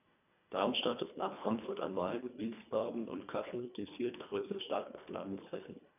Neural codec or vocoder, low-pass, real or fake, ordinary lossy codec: codec, 24 kHz, 1.5 kbps, HILCodec; 3.6 kHz; fake; none